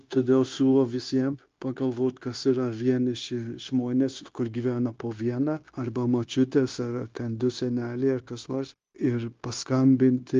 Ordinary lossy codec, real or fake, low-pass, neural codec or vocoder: Opus, 32 kbps; fake; 7.2 kHz; codec, 16 kHz, 0.9 kbps, LongCat-Audio-Codec